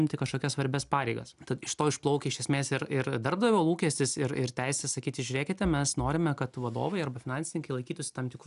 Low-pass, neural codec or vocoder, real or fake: 10.8 kHz; none; real